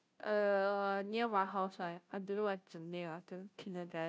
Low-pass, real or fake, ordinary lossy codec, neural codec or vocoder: none; fake; none; codec, 16 kHz, 0.5 kbps, FunCodec, trained on Chinese and English, 25 frames a second